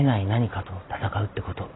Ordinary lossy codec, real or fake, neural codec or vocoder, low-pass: AAC, 16 kbps; fake; vocoder, 44.1 kHz, 128 mel bands every 512 samples, BigVGAN v2; 7.2 kHz